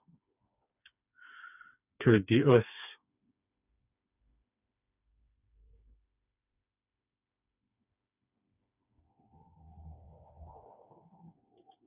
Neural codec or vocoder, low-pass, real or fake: codec, 16 kHz, 4 kbps, FreqCodec, smaller model; 3.6 kHz; fake